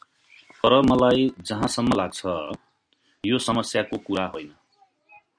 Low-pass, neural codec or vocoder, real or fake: 9.9 kHz; none; real